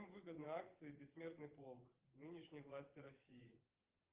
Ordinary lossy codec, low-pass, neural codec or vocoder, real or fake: Opus, 24 kbps; 3.6 kHz; vocoder, 22.05 kHz, 80 mel bands, WaveNeXt; fake